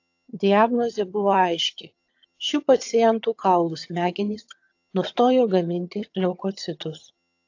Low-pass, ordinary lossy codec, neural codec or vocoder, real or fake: 7.2 kHz; AAC, 48 kbps; vocoder, 22.05 kHz, 80 mel bands, HiFi-GAN; fake